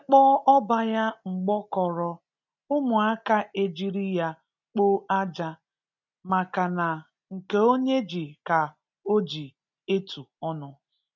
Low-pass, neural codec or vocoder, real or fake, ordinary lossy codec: 7.2 kHz; none; real; none